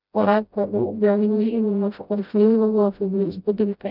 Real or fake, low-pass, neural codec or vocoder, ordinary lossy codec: fake; 5.4 kHz; codec, 16 kHz, 0.5 kbps, FreqCodec, smaller model; none